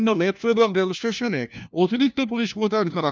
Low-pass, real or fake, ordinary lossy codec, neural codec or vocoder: none; fake; none; codec, 16 kHz, 1 kbps, FunCodec, trained on LibriTTS, 50 frames a second